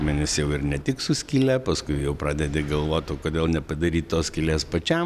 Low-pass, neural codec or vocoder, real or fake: 14.4 kHz; none; real